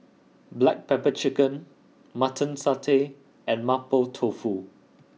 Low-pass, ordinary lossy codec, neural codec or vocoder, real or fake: none; none; none; real